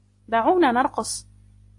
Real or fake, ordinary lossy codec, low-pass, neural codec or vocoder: real; AAC, 48 kbps; 10.8 kHz; none